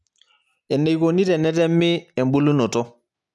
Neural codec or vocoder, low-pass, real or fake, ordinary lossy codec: none; none; real; none